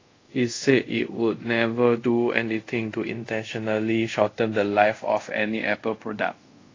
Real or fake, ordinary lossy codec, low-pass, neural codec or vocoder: fake; AAC, 32 kbps; 7.2 kHz; codec, 24 kHz, 0.5 kbps, DualCodec